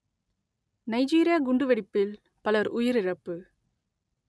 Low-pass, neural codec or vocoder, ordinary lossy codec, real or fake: none; none; none; real